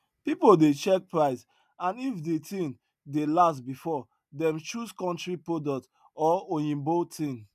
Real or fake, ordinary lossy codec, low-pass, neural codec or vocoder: real; none; 14.4 kHz; none